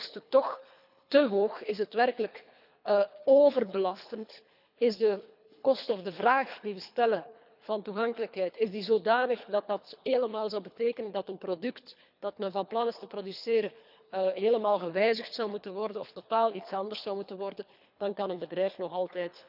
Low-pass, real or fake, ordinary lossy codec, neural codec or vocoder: 5.4 kHz; fake; none; codec, 24 kHz, 3 kbps, HILCodec